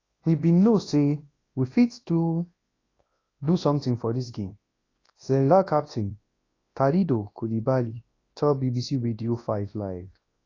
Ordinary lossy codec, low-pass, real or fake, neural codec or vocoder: AAC, 32 kbps; 7.2 kHz; fake; codec, 24 kHz, 0.9 kbps, WavTokenizer, large speech release